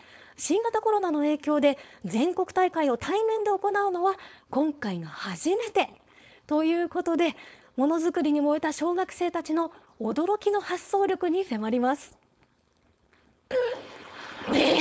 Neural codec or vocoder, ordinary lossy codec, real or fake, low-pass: codec, 16 kHz, 4.8 kbps, FACodec; none; fake; none